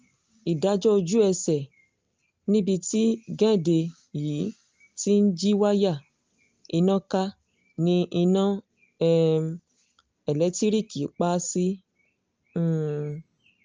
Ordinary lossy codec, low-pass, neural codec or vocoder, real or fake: Opus, 24 kbps; 7.2 kHz; none; real